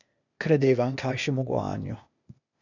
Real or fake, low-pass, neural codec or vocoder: fake; 7.2 kHz; codec, 16 kHz, 0.8 kbps, ZipCodec